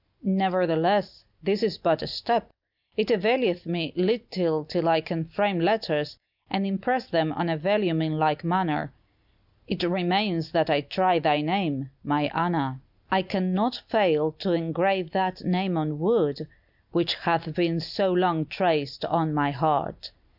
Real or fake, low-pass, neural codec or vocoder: real; 5.4 kHz; none